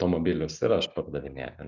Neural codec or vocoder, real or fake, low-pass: none; real; 7.2 kHz